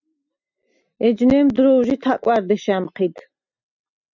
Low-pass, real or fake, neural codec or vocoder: 7.2 kHz; real; none